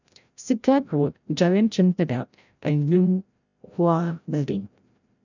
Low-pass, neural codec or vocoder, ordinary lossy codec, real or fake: 7.2 kHz; codec, 16 kHz, 0.5 kbps, FreqCodec, larger model; none; fake